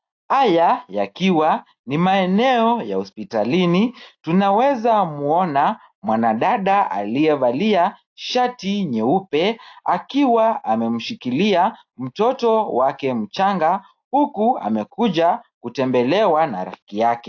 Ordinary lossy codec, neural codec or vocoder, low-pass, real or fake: AAC, 48 kbps; none; 7.2 kHz; real